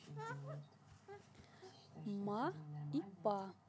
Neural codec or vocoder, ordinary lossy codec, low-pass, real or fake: none; none; none; real